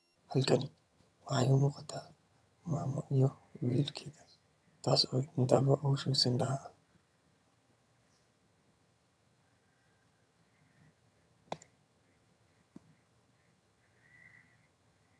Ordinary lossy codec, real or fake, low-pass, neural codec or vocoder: none; fake; none; vocoder, 22.05 kHz, 80 mel bands, HiFi-GAN